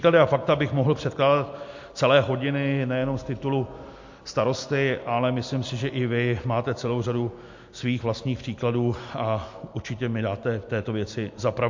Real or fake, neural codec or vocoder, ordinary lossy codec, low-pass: real; none; MP3, 48 kbps; 7.2 kHz